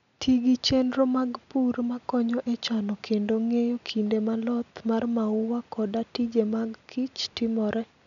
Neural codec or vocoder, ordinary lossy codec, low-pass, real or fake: none; none; 7.2 kHz; real